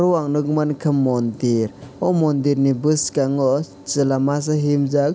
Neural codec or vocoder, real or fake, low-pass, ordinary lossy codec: none; real; none; none